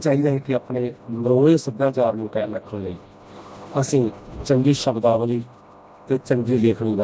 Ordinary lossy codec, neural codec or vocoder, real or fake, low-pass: none; codec, 16 kHz, 1 kbps, FreqCodec, smaller model; fake; none